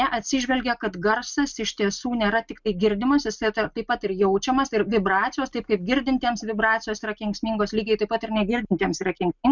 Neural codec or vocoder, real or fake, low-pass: none; real; 7.2 kHz